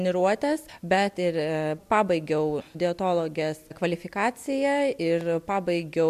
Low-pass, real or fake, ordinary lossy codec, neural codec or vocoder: 14.4 kHz; real; MP3, 96 kbps; none